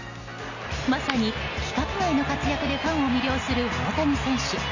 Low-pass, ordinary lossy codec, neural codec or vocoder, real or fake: 7.2 kHz; none; none; real